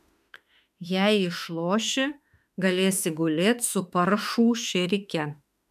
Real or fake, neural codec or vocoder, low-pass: fake; autoencoder, 48 kHz, 32 numbers a frame, DAC-VAE, trained on Japanese speech; 14.4 kHz